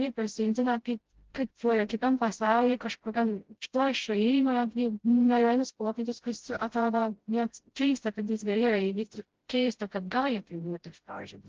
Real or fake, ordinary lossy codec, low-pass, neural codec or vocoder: fake; Opus, 16 kbps; 7.2 kHz; codec, 16 kHz, 0.5 kbps, FreqCodec, smaller model